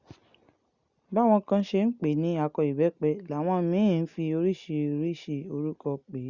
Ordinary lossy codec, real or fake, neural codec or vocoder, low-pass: Opus, 64 kbps; real; none; 7.2 kHz